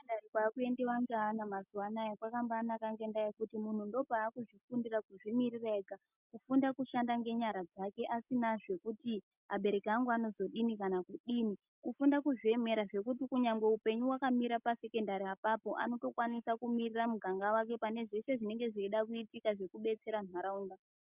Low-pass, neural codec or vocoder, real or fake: 3.6 kHz; none; real